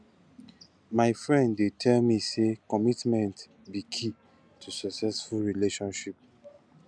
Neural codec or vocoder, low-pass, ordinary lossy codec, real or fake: none; 9.9 kHz; none; real